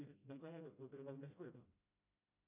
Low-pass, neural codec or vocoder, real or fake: 3.6 kHz; codec, 16 kHz, 0.5 kbps, FreqCodec, smaller model; fake